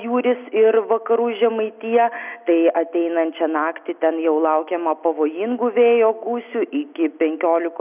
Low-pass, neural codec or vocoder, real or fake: 3.6 kHz; none; real